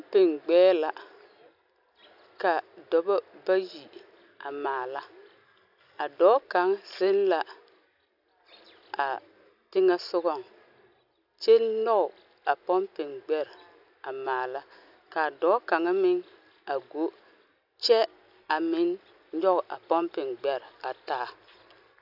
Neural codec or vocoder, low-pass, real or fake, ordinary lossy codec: none; 5.4 kHz; real; AAC, 48 kbps